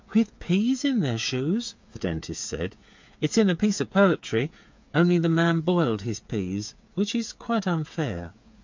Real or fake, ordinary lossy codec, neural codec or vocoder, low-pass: fake; MP3, 64 kbps; codec, 16 kHz, 8 kbps, FreqCodec, smaller model; 7.2 kHz